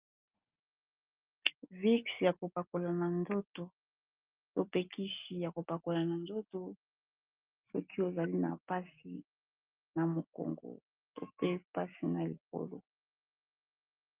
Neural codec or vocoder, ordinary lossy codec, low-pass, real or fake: none; Opus, 24 kbps; 3.6 kHz; real